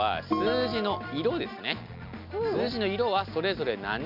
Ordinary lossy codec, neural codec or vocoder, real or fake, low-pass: MP3, 48 kbps; none; real; 5.4 kHz